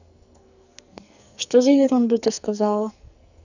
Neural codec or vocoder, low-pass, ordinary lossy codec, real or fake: codec, 44.1 kHz, 2.6 kbps, SNAC; 7.2 kHz; none; fake